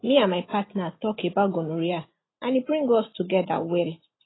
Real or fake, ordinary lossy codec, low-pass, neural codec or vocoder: real; AAC, 16 kbps; 7.2 kHz; none